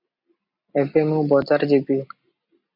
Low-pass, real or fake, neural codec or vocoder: 5.4 kHz; real; none